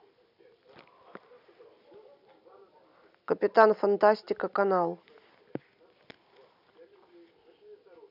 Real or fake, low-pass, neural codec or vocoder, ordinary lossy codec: real; 5.4 kHz; none; none